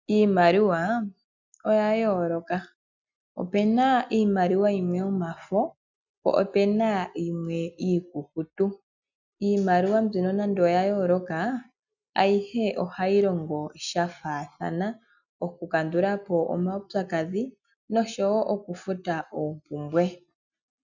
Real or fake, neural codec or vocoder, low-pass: real; none; 7.2 kHz